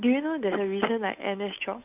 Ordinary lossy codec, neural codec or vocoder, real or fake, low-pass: none; codec, 16 kHz, 8 kbps, FunCodec, trained on Chinese and English, 25 frames a second; fake; 3.6 kHz